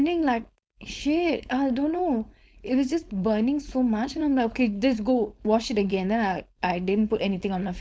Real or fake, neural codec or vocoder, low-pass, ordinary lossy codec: fake; codec, 16 kHz, 4.8 kbps, FACodec; none; none